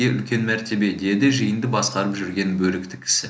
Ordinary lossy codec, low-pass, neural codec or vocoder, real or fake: none; none; none; real